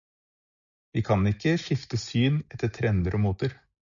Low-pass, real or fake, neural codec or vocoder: 7.2 kHz; real; none